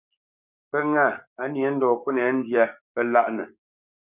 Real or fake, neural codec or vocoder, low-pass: fake; codec, 16 kHz, 6 kbps, DAC; 3.6 kHz